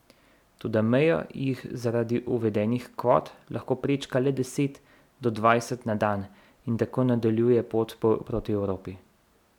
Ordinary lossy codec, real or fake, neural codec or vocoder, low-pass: none; real; none; 19.8 kHz